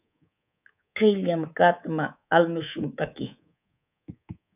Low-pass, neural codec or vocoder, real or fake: 3.6 kHz; codec, 24 kHz, 3.1 kbps, DualCodec; fake